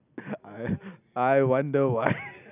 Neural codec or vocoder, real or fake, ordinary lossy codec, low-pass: none; real; none; 3.6 kHz